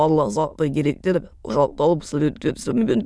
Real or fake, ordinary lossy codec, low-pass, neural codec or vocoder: fake; none; none; autoencoder, 22.05 kHz, a latent of 192 numbers a frame, VITS, trained on many speakers